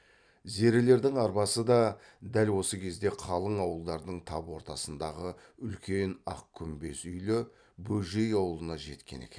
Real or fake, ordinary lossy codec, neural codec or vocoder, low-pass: real; none; none; 9.9 kHz